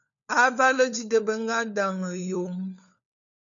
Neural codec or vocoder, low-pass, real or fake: codec, 16 kHz, 4 kbps, FunCodec, trained on LibriTTS, 50 frames a second; 7.2 kHz; fake